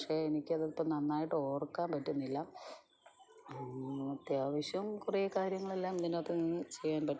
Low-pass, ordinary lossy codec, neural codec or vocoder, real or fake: none; none; none; real